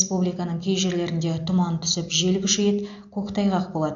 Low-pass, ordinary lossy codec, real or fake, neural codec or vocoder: 7.2 kHz; AAC, 64 kbps; real; none